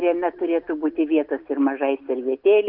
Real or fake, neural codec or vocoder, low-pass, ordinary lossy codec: real; none; 5.4 kHz; Opus, 24 kbps